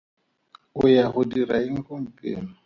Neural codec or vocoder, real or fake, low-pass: none; real; 7.2 kHz